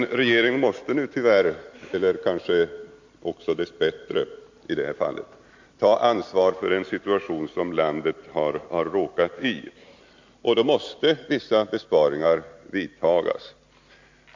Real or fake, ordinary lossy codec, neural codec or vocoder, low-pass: real; MP3, 48 kbps; none; 7.2 kHz